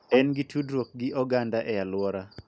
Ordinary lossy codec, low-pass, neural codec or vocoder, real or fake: none; none; none; real